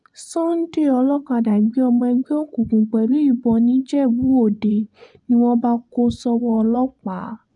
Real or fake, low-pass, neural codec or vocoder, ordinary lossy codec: fake; 10.8 kHz; vocoder, 24 kHz, 100 mel bands, Vocos; none